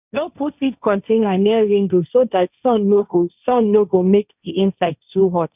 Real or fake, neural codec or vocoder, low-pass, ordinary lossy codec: fake; codec, 16 kHz, 1.1 kbps, Voila-Tokenizer; 3.6 kHz; none